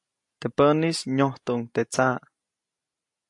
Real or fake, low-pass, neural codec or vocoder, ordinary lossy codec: real; 10.8 kHz; none; AAC, 64 kbps